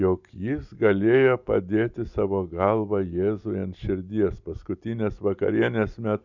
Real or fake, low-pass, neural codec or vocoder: real; 7.2 kHz; none